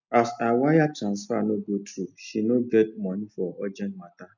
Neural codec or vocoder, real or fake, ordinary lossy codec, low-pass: none; real; none; 7.2 kHz